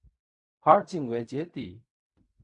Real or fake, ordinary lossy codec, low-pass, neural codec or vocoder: fake; Opus, 64 kbps; 10.8 kHz; codec, 16 kHz in and 24 kHz out, 0.4 kbps, LongCat-Audio-Codec, fine tuned four codebook decoder